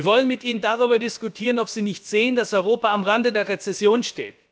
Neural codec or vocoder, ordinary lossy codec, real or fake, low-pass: codec, 16 kHz, about 1 kbps, DyCAST, with the encoder's durations; none; fake; none